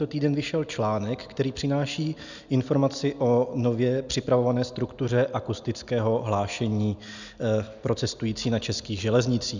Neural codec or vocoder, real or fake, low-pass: none; real; 7.2 kHz